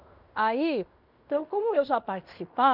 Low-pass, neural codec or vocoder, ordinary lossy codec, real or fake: 5.4 kHz; codec, 16 kHz in and 24 kHz out, 0.9 kbps, LongCat-Audio-Codec, fine tuned four codebook decoder; none; fake